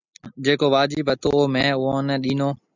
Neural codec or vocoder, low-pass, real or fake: none; 7.2 kHz; real